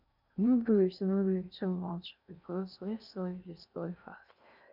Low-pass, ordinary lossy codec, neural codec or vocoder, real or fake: 5.4 kHz; none; codec, 16 kHz in and 24 kHz out, 0.6 kbps, FocalCodec, streaming, 2048 codes; fake